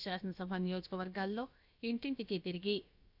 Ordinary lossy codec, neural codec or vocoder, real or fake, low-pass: none; codec, 16 kHz, about 1 kbps, DyCAST, with the encoder's durations; fake; 5.4 kHz